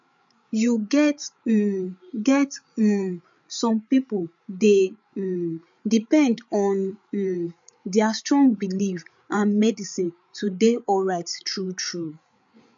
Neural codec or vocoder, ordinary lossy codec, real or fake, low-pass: codec, 16 kHz, 8 kbps, FreqCodec, larger model; MP3, 64 kbps; fake; 7.2 kHz